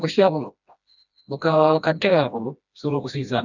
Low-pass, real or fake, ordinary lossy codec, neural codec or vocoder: 7.2 kHz; fake; none; codec, 16 kHz, 1 kbps, FreqCodec, smaller model